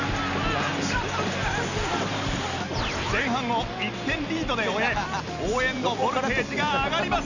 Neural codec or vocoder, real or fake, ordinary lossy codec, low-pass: none; real; none; 7.2 kHz